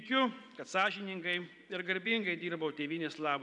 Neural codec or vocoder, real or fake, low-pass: vocoder, 24 kHz, 100 mel bands, Vocos; fake; 10.8 kHz